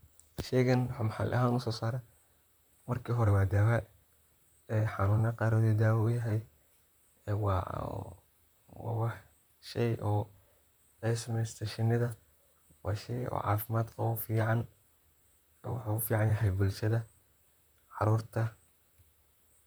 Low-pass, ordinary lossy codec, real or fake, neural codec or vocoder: none; none; fake; vocoder, 44.1 kHz, 128 mel bands, Pupu-Vocoder